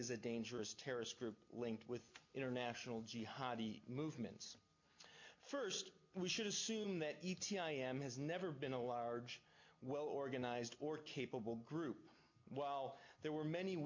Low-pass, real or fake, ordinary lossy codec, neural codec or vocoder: 7.2 kHz; real; MP3, 64 kbps; none